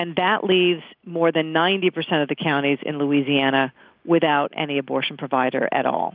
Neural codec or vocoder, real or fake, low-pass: none; real; 5.4 kHz